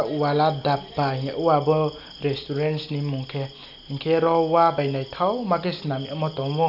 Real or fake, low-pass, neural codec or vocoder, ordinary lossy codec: real; 5.4 kHz; none; none